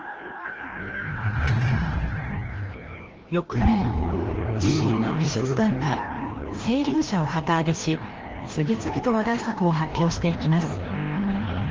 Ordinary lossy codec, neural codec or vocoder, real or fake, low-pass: Opus, 16 kbps; codec, 16 kHz, 1 kbps, FreqCodec, larger model; fake; 7.2 kHz